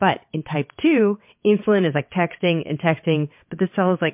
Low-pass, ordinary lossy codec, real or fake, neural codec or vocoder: 3.6 kHz; MP3, 32 kbps; fake; vocoder, 22.05 kHz, 80 mel bands, WaveNeXt